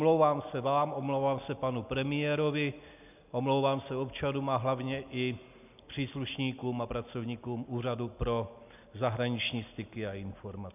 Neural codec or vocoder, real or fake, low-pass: none; real; 3.6 kHz